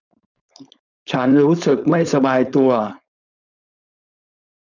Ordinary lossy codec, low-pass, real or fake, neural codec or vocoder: none; 7.2 kHz; fake; codec, 16 kHz, 4.8 kbps, FACodec